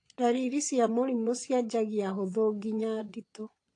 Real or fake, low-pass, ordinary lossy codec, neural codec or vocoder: fake; 9.9 kHz; AAC, 48 kbps; vocoder, 22.05 kHz, 80 mel bands, Vocos